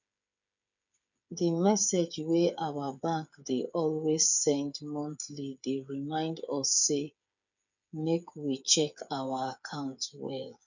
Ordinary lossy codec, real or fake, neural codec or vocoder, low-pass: none; fake; codec, 16 kHz, 8 kbps, FreqCodec, smaller model; 7.2 kHz